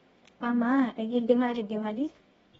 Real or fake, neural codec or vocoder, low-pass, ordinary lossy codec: fake; codec, 24 kHz, 0.9 kbps, WavTokenizer, medium music audio release; 10.8 kHz; AAC, 24 kbps